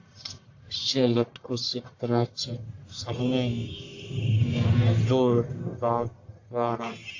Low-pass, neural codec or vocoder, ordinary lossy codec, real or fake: 7.2 kHz; codec, 44.1 kHz, 1.7 kbps, Pupu-Codec; AAC, 48 kbps; fake